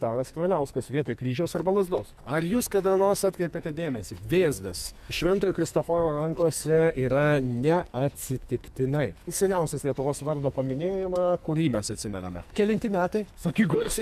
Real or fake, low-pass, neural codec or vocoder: fake; 14.4 kHz; codec, 44.1 kHz, 2.6 kbps, SNAC